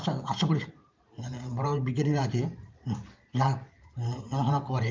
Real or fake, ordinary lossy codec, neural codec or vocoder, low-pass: fake; Opus, 24 kbps; vocoder, 44.1 kHz, 128 mel bands every 512 samples, BigVGAN v2; 7.2 kHz